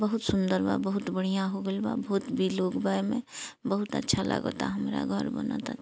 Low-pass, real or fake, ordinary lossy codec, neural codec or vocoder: none; real; none; none